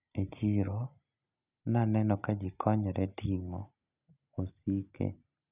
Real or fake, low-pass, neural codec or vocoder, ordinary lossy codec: real; 3.6 kHz; none; none